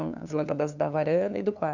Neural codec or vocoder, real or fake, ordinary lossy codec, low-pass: codec, 16 kHz, 2 kbps, FunCodec, trained on LibriTTS, 25 frames a second; fake; none; 7.2 kHz